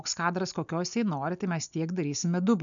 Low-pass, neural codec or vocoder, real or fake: 7.2 kHz; none; real